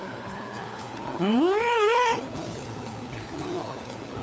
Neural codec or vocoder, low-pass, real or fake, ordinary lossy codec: codec, 16 kHz, 4 kbps, FunCodec, trained on LibriTTS, 50 frames a second; none; fake; none